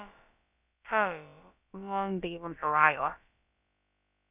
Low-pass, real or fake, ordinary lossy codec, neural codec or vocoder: 3.6 kHz; fake; none; codec, 16 kHz, about 1 kbps, DyCAST, with the encoder's durations